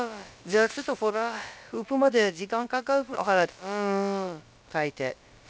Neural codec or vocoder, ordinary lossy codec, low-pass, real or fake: codec, 16 kHz, about 1 kbps, DyCAST, with the encoder's durations; none; none; fake